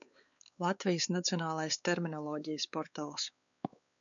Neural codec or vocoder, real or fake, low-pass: codec, 16 kHz, 4 kbps, X-Codec, WavLM features, trained on Multilingual LibriSpeech; fake; 7.2 kHz